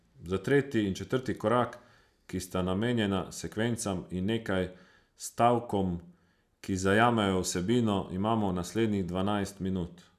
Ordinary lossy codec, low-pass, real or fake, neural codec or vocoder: none; 14.4 kHz; real; none